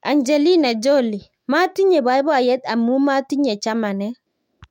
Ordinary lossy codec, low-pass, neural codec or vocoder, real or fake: MP3, 64 kbps; 19.8 kHz; autoencoder, 48 kHz, 128 numbers a frame, DAC-VAE, trained on Japanese speech; fake